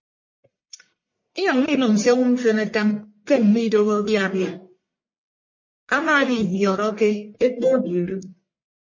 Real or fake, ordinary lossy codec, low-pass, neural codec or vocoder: fake; MP3, 32 kbps; 7.2 kHz; codec, 44.1 kHz, 1.7 kbps, Pupu-Codec